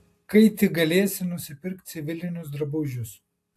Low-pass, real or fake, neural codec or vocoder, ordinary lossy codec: 14.4 kHz; real; none; AAC, 64 kbps